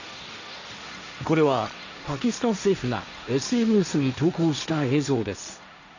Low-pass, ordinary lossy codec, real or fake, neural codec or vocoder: 7.2 kHz; none; fake; codec, 16 kHz, 1.1 kbps, Voila-Tokenizer